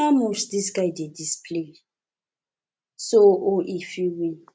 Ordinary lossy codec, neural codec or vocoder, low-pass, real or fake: none; none; none; real